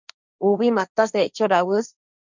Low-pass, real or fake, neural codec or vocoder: 7.2 kHz; fake; codec, 16 kHz, 1.1 kbps, Voila-Tokenizer